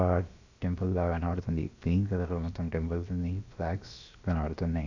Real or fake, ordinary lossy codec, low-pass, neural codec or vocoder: fake; AAC, 48 kbps; 7.2 kHz; codec, 16 kHz, about 1 kbps, DyCAST, with the encoder's durations